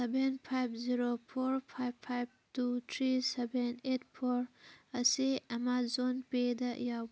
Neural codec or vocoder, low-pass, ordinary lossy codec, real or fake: none; none; none; real